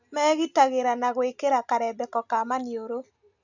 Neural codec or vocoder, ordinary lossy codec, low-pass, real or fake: none; none; 7.2 kHz; real